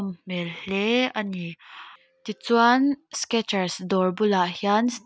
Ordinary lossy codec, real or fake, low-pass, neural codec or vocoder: none; real; none; none